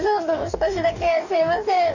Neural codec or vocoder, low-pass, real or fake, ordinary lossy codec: codec, 44.1 kHz, 2.6 kbps, DAC; 7.2 kHz; fake; none